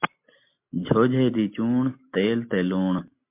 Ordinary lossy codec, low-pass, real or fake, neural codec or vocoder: MP3, 32 kbps; 3.6 kHz; real; none